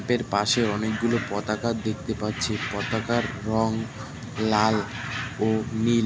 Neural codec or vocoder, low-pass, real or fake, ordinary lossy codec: none; none; real; none